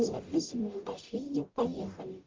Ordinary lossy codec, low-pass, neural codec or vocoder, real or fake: Opus, 24 kbps; 7.2 kHz; codec, 44.1 kHz, 0.9 kbps, DAC; fake